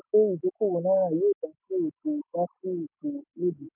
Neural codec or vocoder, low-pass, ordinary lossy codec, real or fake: none; 3.6 kHz; none; real